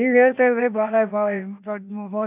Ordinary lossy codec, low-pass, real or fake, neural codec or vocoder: none; 3.6 kHz; fake; codec, 16 kHz, 0.8 kbps, ZipCodec